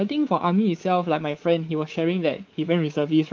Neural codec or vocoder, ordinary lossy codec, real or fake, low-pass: codec, 24 kHz, 3.1 kbps, DualCodec; Opus, 24 kbps; fake; 7.2 kHz